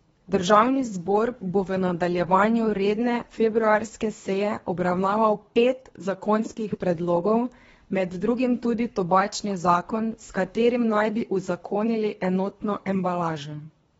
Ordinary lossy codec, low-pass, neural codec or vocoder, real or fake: AAC, 24 kbps; 10.8 kHz; codec, 24 kHz, 3 kbps, HILCodec; fake